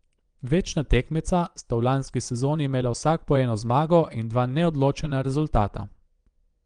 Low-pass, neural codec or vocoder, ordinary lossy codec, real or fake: 9.9 kHz; vocoder, 22.05 kHz, 80 mel bands, Vocos; Opus, 24 kbps; fake